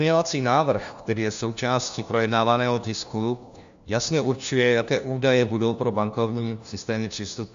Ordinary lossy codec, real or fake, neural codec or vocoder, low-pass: MP3, 64 kbps; fake; codec, 16 kHz, 1 kbps, FunCodec, trained on LibriTTS, 50 frames a second; 7.2 kHz